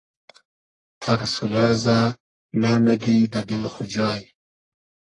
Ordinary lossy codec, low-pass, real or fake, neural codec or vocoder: AAC, 32 kbps; 10.8 kHz; fake; codec, 44.1 kHz, 1.7 kbps, Pupu-Codec